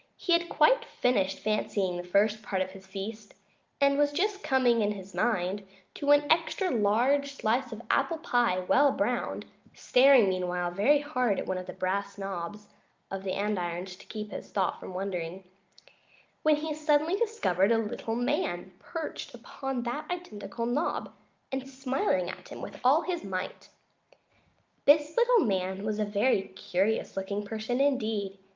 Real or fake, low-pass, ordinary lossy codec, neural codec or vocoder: real; 7.2 kHz; Opus, 24 kbps; none